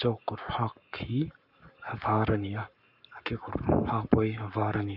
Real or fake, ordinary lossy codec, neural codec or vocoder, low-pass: fake; none; codec, 44.1 kHz, 7.8 kbps, Pupu-Codec; 5.4 kHz